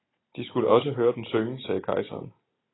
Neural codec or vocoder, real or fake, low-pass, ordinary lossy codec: none; real; 7.2 kHz; AAC, 16 kbps